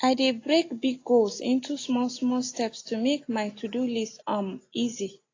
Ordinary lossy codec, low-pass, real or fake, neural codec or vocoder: AAC, 32 kbps; 7.2 kHz; real; none